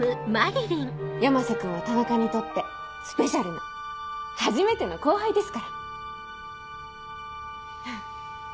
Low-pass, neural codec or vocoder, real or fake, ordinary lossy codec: none; none; real; none